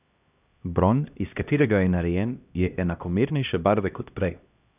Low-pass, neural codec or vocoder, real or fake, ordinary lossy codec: 3.6 kHz; codec, 16 kHz, 1 kbps, X-Codec, HuBERT features, trained on LibriSpeech; fake; none